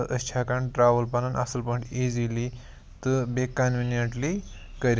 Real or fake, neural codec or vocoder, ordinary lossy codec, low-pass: real; none; none; none